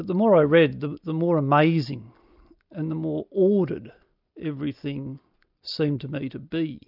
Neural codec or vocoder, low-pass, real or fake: none; 5.4 kHz; real